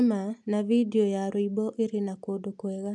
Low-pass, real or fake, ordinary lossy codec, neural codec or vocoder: 10.8 kHz; real; MP3, 96 kbps; none